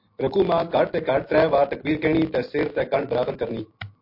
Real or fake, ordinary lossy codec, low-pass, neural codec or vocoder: real; MP3, 32 kbps; 5.4 kHz; none